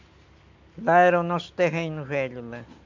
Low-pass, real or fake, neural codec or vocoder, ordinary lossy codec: 7.2 kHz; real; none; MP3, 64 kbps